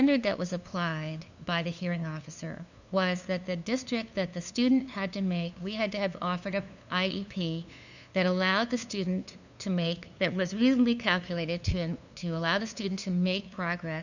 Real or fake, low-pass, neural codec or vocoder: fake; 7.2 kHz; codec, 16 kHz, 2 kbps, FunCodec, trained on LibriTTS, 25 frames a second